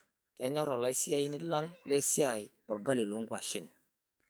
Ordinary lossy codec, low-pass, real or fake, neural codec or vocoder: none; none; fake; codec, 44.1 kHz, 2.6 kbps, SNAC